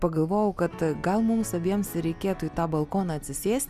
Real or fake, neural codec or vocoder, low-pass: real; none; 14.4 kHz